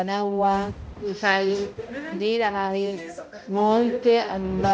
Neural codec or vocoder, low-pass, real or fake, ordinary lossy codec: codec, 16 kHz, 0.5 kbps, X-Codec, HuBERT features, trained on balanced general audio; none; fake; none